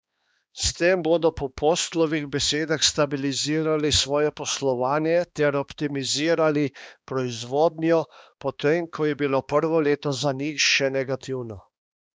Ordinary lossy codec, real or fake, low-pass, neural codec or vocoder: none; fake; none; codec, 16 kHz, 2 kbps, X-Codec, HuBERT features, trained on balanced general audio